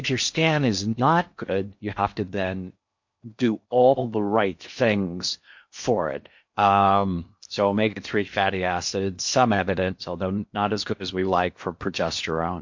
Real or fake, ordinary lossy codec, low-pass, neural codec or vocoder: fake; MP3, 48 kbps; 7.2 kHz; codec, 16 kHz in and 24 kHz out, 0.8 kbps, FocalCodec, streaming, 65536 codes